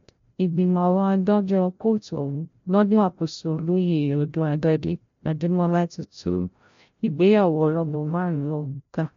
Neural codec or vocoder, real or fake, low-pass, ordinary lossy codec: codec, 16 kHz, 0.5 kbps, FreqCodec, larger model; fake; 7.2 kHz; MP3, 48 kbps